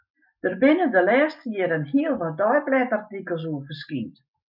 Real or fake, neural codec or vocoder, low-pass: real; none; 5.4 kHz